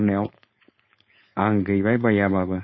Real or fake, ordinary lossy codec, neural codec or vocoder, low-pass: fake; MP3, 24 kbps; codec, 16 kHz, 4.8 kbps, FACodec; 7.2 kHz